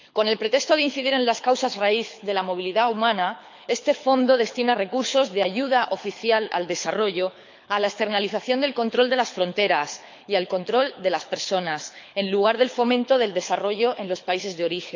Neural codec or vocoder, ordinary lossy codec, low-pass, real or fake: codec, 24 kHz, 6 kbps, HILCodec; MP3, 64 kbps; 7.2 kHz; fake